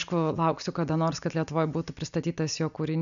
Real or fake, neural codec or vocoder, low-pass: real; none; 7.2 kHz